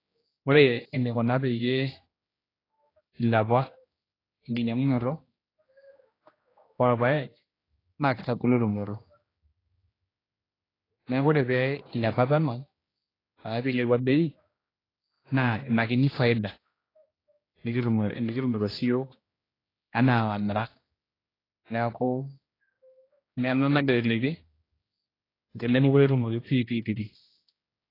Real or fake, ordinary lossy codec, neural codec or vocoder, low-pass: fake; AAC, 24 kbps; codec, 16 kHz, 1 kbps, X-Codec, HuBERT features, trained on general audio; 5.4 kHz